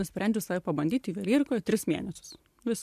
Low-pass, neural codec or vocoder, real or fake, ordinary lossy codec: 14.4 kHz; none; real; MP3, 96 kbps